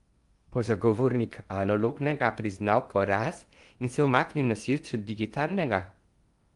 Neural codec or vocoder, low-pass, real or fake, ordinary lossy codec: codec, 16 kHz in and 24 kHz out, 0.8 kbps, FocalCodec, streaming, 65536 codes; 10.8 kHz; fake; Opus, 32 kbps